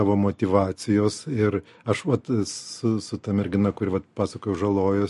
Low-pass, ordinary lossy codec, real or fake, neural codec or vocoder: 14.4 kHz; MP3, 48 kbps; real; none